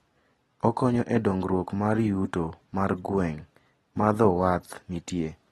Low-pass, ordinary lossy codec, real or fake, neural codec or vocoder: 19.8 kHz; AAC, 32 kbps; real; none